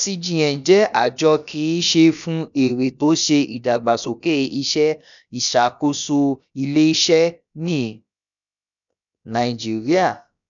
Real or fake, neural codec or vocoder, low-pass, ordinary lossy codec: fake; codec, 16 kHz, about 1 kbps, DyCAST, with the encoder's durations; 7.2 kHz; none